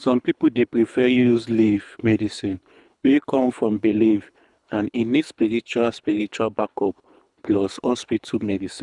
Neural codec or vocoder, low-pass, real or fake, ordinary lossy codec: codec, 24 kHz, 3 kbps, HILCodec; 10.8 kHz; fake; none